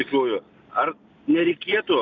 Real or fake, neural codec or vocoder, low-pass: real; none; 7.2 kHz